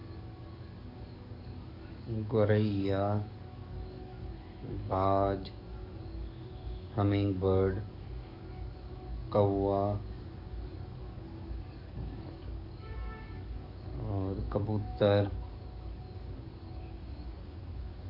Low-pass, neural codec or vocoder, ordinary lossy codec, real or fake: 5.4 kHz; codec, 44.1 kHz, 7.8 kbps, DAC; Opus, 64 kbps; fake